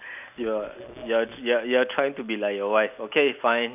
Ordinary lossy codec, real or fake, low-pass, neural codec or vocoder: none; real; 3.6 kHz; none